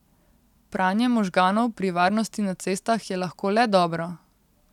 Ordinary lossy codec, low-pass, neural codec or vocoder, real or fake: none; 19.8 kHz; none; real